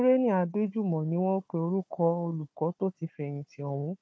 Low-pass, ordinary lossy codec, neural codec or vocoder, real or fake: none; none; codec, 16 kHz, 4 kbps, FunCodec, trained on Chinese and English, 50 frames a second; fake